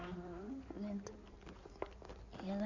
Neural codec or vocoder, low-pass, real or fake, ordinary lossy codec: codec, 16 kHz in and 24 kHz out, 2.2 kbps, FireRedTTS-2 codec; 7.2 kHz; fake; Opus, 64 kbps